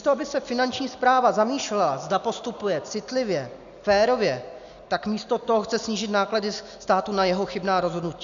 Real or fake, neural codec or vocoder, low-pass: real; none; 7.2 kHz